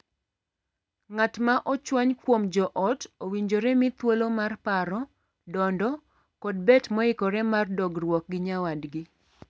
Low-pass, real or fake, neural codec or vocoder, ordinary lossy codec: none; real; none; none